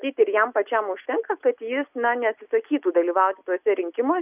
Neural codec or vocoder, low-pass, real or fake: none; 3.6 kHz; real